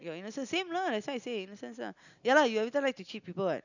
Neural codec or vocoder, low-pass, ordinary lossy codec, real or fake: none; 7.2 kHz; none; real